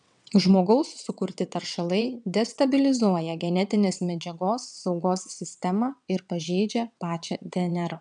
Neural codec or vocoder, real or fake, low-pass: vocoder, 22.05 kHz, 80 mel bands, WaveNeXt; fake; 9.9 kHz